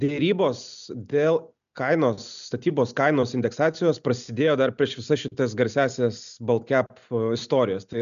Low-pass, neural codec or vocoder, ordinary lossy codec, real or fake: 7.2 kHz; none; MP3, 96 kbps; real